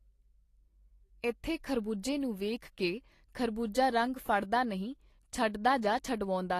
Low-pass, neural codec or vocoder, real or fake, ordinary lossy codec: 14.4 kHz; none; real; AAC, 48 kbps